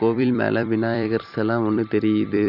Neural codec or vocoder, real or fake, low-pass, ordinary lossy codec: vocoder, 44.1 kHz, 128 mel bands every 256 samples, BigVGAN v2; fake; 5.4 kHz; none